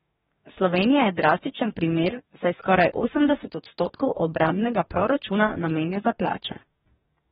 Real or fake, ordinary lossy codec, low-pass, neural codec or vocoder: fake; AAC, 16 kbps; 19.8 kHz; codec, 44.1 kHz, 2.6 kbps, DAC